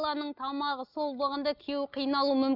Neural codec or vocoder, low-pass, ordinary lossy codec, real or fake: none; 5.4 kHz; none; real